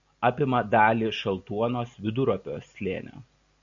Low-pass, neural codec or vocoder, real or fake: 7.2 kHz; none; real